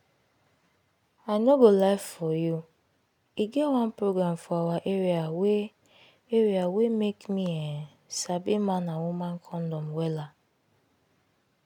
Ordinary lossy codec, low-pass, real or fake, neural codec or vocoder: none; 19.8 kHz; real; none